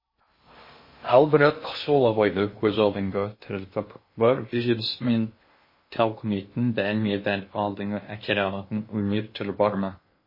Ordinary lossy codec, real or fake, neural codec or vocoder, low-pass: MP3, 24 kbps; fake; codec, 16 kHz in and 24 kHz out, 0.6 kbps, FocalCodec, streaming, 2048 codes; 5.4 kHz